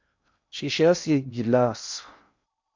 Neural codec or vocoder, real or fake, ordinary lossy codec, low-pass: codec, 16 kHz in and 24 kHz out, 0.6 kbps, FocalCodec, streaming, 2048 codes; fake; MP3, 64 kbps; 7.2 kHz